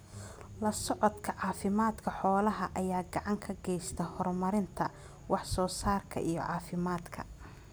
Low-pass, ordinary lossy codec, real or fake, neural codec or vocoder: none; none; real; none